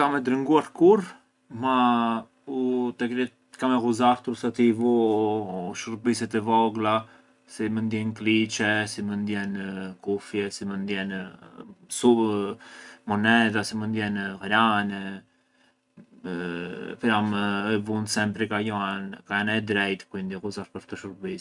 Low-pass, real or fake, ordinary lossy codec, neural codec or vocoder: 10.8 kHz; real; none; none